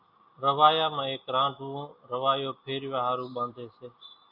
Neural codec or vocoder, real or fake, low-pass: none; real; 5.4 kHz